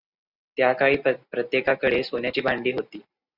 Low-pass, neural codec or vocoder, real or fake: 5.4 kHz; none; real